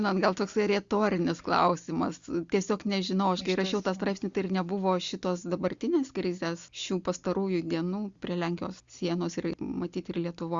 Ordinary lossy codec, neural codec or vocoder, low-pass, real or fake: Opus, 64 kbps; none; 7.2 kHz; real